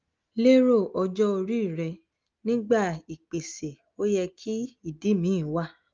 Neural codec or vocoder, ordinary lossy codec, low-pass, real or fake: none; Opus, 24 kbps; 7.2 kHz; real